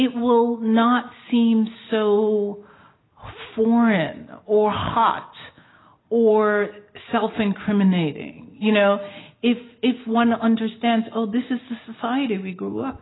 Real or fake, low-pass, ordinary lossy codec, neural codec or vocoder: real; 7.2 kHz; AAC, 16 kbps; none